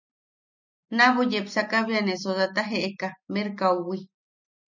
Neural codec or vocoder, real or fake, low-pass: none; real; 7.2 kHz